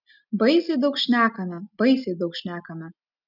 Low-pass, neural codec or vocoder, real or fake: 5.4 kHz; none; real